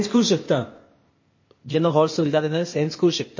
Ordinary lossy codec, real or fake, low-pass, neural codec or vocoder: MP3, 32 kbps; fake; 7.2 kHz; codec, 16 kHz, 0.8 kbps, ZipCodec